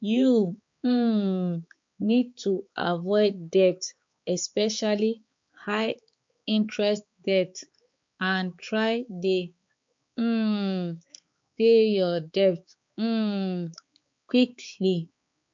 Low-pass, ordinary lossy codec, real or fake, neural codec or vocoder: 7.2 kHz; MP3, 48 kbps; fake; codec, 16 kHz, 4 kbps, X-Codec, HuBERT features, trained on balanced general audio